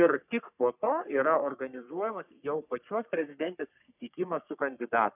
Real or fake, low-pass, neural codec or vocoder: fake; 3.6 kHz; codec, 44.1 kHz, 3.4 kbps, Pupu-Codec